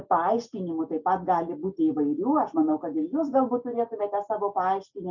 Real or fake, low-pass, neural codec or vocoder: real; 7.2 kHz; none